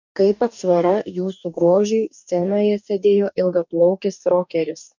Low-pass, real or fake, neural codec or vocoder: 7.2 kHz; fake; codec, 44.1 kHz, 2.6 kbps, DAC